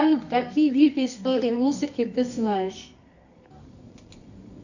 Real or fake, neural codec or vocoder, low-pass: fake; codec, 24 kHz, 0.9 kbps, WavTokenizer, medium music audio release; 7.2 kHz